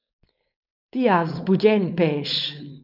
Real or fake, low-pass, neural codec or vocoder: fake; 5.4 kHz; codec, 16 kHz, 4.8 kbps, FACodec